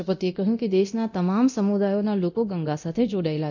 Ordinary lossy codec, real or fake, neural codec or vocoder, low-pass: none; fake; codec, 24 kHz, 0.9 kbps, DualCodec; 7.2 kHz